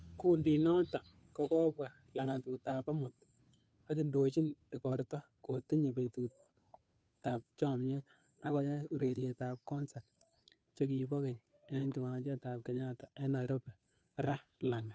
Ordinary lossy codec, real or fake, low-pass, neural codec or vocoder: none; fake; none; codec, 16 kHz, 2 kbps, FunCodec, trained on Chinese and English, 25 frames a second